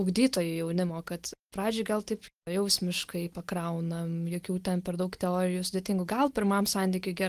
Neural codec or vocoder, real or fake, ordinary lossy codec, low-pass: none; real; Opus, 24 kbps; 14.4 kHz